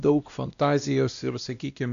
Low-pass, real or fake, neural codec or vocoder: 7.2 kHz; fake; codec, 16 kHz, 1 kbps, X-Codec, WavLM features, trained on Multilingual LibriSpeech